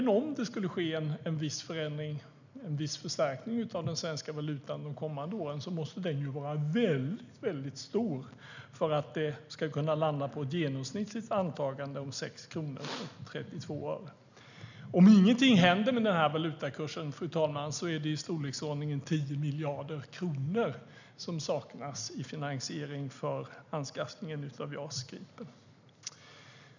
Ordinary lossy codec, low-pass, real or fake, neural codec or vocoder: none; 7.2 kHz; real; none